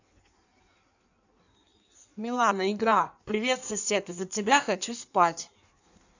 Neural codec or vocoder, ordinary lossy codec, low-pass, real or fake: codec, 16 kHz in and 24 kHz out, 1.1 kbps, FireRedTTS-2 codec; none; 7.2 kHz; fake